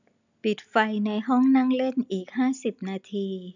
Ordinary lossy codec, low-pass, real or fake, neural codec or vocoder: none; 7.2 kHz; real; none